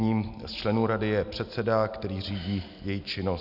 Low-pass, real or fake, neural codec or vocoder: 5.4 kHz; real; none